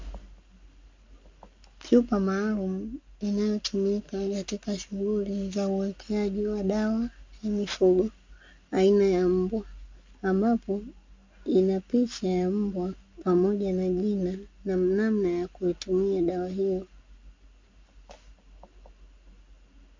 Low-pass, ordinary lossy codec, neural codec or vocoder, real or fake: 7.2 kHz; MP3, 48 kbps; none; real